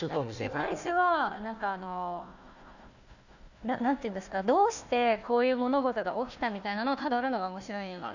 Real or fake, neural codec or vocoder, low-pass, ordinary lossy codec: fake; codec, 16 kHz, 1 kbps, FunCodec, trained on Chinese and English, 50 frames a second; 7.2 kHz; none